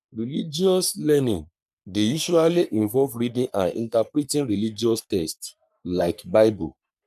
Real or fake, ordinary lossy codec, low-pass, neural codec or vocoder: fake; none; 14.4 kHz; codec, 44.1 kHz, 3.4 kbps, Pupu-Codec